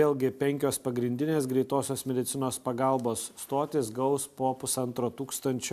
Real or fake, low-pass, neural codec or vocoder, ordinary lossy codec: real; 14.4 kHz; none; AAC, 96 kbps